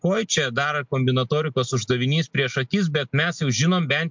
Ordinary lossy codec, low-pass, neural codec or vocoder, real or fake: MP3, 64 kbps; 7.2 kHz; none; real